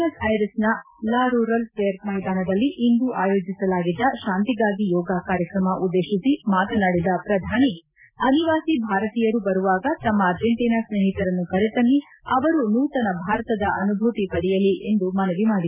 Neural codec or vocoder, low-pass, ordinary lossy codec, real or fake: none; 3.6 kHz; none; real